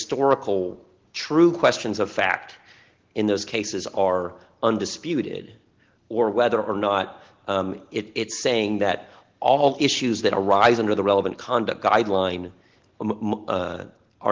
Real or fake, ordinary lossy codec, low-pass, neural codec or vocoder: real; Opus, 16 kbps; 7.2 kHz; none